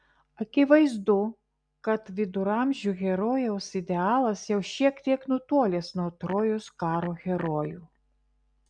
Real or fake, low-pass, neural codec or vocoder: real; 9.9 kHz; none